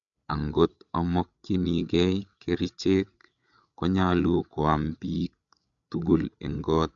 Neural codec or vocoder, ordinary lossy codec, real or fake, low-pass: codec, 16 kHz, 8 kbps, FreqCodec, larger model; none; fake; 7.2 kHz